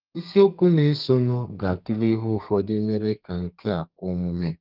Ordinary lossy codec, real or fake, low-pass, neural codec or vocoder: Opus, 24 kbps; fake; 5.4 kHz; codec, 32 kHz, 1.9 kbps, SNAC